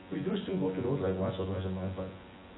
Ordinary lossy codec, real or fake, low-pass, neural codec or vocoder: AAC, 16 kbps; fake; 7.2 kHz; vocoder, 24 kHz, 100 mel bands, Vocos